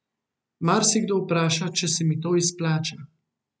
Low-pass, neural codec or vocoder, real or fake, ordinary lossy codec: none; none; real; none